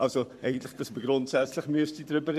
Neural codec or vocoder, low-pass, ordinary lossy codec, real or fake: codec, 44.1 kHz, 7.8 kbps, Pupu-Codec; 14.4 kHz; none; fake